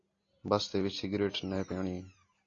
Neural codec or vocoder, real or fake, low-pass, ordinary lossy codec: none; real; 7.2 kHz; AAC, 32 kbps